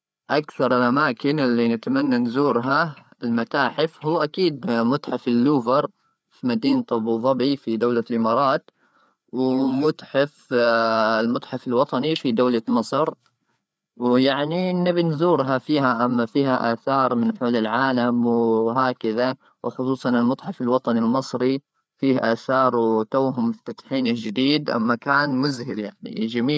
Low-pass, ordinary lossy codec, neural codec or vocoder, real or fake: none; none; codec, 16 kHz, 4 kbps, FreqCodec, larger model; fake